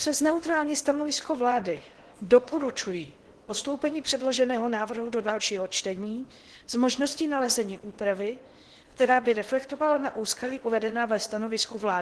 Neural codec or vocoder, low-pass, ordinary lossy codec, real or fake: codec, 16 kHz in and 24 kHz out, 0.8 kbps, FocalCodec, streaming, 65536 codes; 10.8 kHz; Opus, 16 kbps; fake